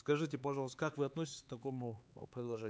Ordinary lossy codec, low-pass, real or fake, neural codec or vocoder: none; none; fake; codec, 16 kHz, 4 kbps, X-Codec, HuBERT features, trained on LibriSpeech